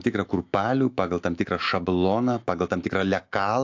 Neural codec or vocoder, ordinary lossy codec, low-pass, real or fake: autoencoder, 48 kHz, 128 numbers a frame, DAC-VAE, trained on Japanese speech; AAC, 48 kbps; 7.2 kHz; fake